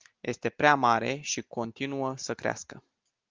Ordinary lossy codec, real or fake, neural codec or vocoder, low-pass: Opus, 32 kbps; real; none; 7.2 kHz